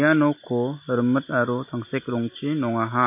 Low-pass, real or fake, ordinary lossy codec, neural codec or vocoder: 3.6 kHz; real; MP3, 24 kbps; none